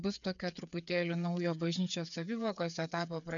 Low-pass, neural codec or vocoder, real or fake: 7.2 kHz; codec, 16 kHz, 8 kbps, FreqCodec, smaller model; fake